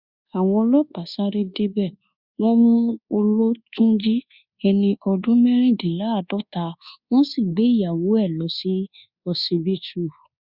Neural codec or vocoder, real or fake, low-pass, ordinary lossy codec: codec, 24 kHz, 1.2 kbps, DualCodec; fake; 5.4 kHz; Opus, 64 kbps